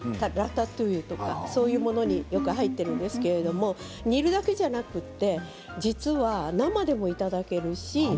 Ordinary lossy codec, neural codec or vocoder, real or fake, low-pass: none; none; real; none